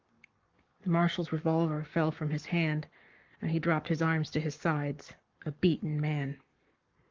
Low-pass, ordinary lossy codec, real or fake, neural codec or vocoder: 7.2 kHz; Opus, 24 kbps; fake; codec, 44.1 kHz, 7.8 kbps, Pupu-Codec